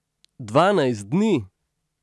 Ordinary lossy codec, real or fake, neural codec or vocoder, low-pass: none; real; none; none